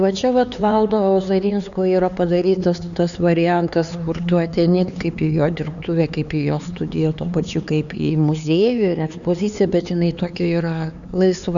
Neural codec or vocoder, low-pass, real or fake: codec, 16 kHz, 4 kbps, X-Codec, HuBERT features, trained on LibriSpeech; 7.2 kHz; fake